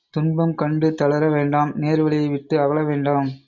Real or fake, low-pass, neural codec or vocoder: real; 7.2 kHz; none